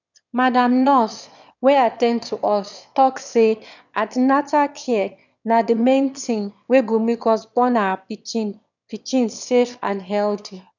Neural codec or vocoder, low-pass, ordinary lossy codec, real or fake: autoencoder, 22.05 kHz, a latent of 192 numbers a frame, VITS, trained on one speaker; 7.2 kHz; none; fake